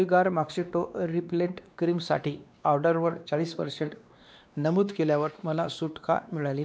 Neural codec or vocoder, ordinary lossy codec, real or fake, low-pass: codec, 16 kHz, 2 kbps, X-Codec, WavLM features, trained on Multilingual LibriSpeech; none; fake; none